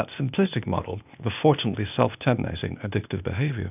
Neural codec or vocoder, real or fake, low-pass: codec, 16 kHz, 0.8 kbps, ZipCodec; fake; 3.6 kHz